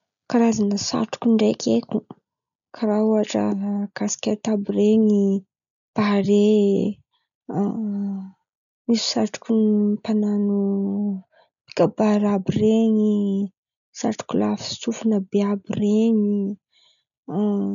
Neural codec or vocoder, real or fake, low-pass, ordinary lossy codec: none; real; 7.2 kHz; none